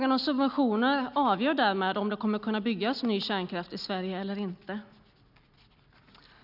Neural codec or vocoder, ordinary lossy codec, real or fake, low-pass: none; none; real; 5.4 kHz